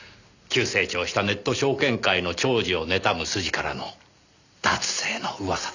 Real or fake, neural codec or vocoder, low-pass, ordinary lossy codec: real; none; 7.2 kHz; none